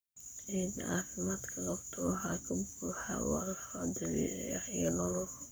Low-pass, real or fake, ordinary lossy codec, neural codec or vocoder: none; fake; none; codec, 44.1 kHz, 7.8 kbps, Pupu-Codec